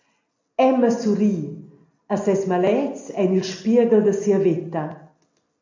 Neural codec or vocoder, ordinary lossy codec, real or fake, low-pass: none; AAC, 48 kbps; real; 7.2 kHz